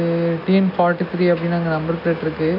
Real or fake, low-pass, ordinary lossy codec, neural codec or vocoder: real; 5.4 kHz; AAC, 32 kbps; none